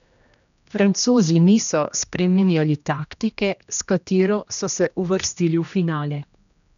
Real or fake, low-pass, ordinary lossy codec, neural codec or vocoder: fake; 7.2 kHz; none; codec, 16 kHz, 1 kbps, X-Codec, HuBERT features, trained on general audio